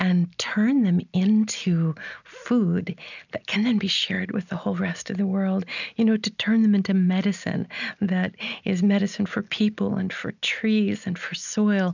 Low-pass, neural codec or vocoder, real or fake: 7.2 kHz; none; real